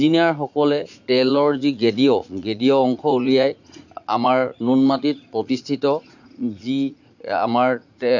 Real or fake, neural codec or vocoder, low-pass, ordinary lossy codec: fake; vocoder, 44.1 kHz, 80 mel bands, Vocos; 7.2 kHz; none